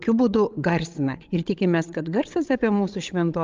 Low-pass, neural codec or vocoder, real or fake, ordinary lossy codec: 7.2 kHz; codec, 16 kHz, 16 kbps, FreqCodec, larger model; fake; Opus, 32 kbps